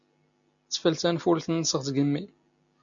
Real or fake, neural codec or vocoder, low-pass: real; none; 7.2 kHz